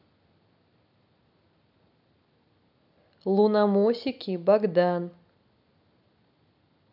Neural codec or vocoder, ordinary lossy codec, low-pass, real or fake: none; none; 5.4 kHz; real